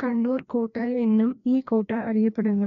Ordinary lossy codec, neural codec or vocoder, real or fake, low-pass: none; codec, 16 kHz, 1 kbps, FreqCodec, larger model; fake; 7.2 kHz